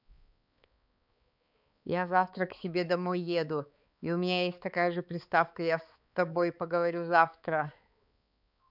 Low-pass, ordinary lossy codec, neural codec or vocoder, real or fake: 5.4 kHz; none; codec, 16 kHz, 4 kbps, X-Codec, HuBERT features, trained on balanced general audio; fake